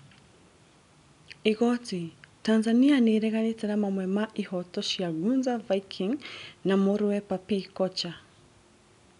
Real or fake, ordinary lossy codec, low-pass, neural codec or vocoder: real; none; 10.8 kHz; none